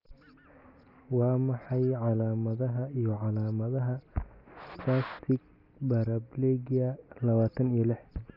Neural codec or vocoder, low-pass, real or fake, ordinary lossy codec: none; 5.4 kHz; real; none